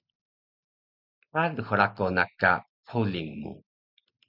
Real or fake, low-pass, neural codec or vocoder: real; 5.4 kHz; none